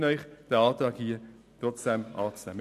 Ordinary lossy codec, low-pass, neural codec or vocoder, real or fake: none; 14.4 kHz; none; real